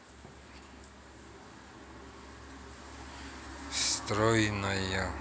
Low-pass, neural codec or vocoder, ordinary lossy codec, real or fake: none; none; none; real